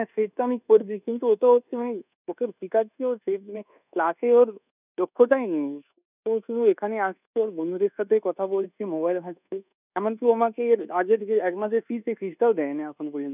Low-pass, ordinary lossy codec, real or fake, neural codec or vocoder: 3.6 kHz; none; fake; codec, 24 kHz, 1.2 kbps, DualCodec